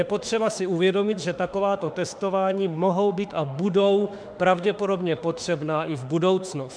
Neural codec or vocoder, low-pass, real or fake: autoencoder, 48 kHz, 32 numbers a frame, DAC-VAE, trained on Japanese speech; 9.9 kHz; fake